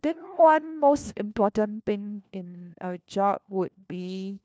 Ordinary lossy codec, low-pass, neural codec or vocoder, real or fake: none; none; codec, 16 kHz, 1 kbps, FunCodec, trained on LibriTTS, 50 frames a second; fake